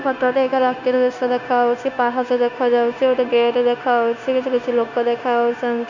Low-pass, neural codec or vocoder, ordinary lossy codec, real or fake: 7.2 kHz; codec, 16 kHz, 0.9 kbps, LongCat-Audio-Codec; none; fake